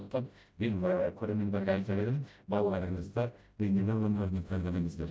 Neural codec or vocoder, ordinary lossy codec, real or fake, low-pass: codec, 16 kHz, 0.5 kbps, FreqCodec, smaller model; none; fake; none